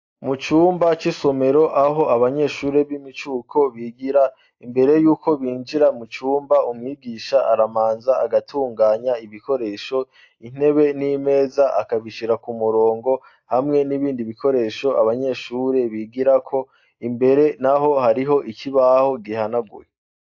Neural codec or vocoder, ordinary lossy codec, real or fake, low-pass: none; AAC, 48 kbps; real; 7.2 kHz